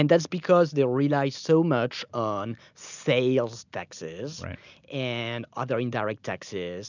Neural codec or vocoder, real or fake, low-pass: none; real; 7.2 kHz